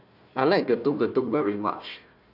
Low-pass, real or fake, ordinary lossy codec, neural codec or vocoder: 5.4 kHz; fake; none; codec, 16 kHz, 1 kbps, FunCodec, trained on Chinese and English, 50 frames a second